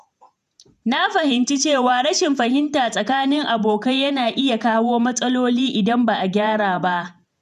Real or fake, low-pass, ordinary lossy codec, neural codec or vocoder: fake; 14.4 kHz; none; vocoder, 48 kHz, 128 mel bands, Vocos